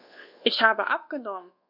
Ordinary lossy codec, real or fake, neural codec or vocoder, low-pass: none; fake; codec, 24 kHz, 1.2 kbps, DualCodec; 5.4 kHz